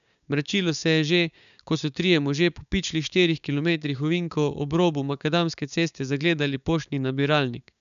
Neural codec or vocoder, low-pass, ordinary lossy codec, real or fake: codec, 16 kHz, 6 kbps, DAC; 7.2 kHz; none; fake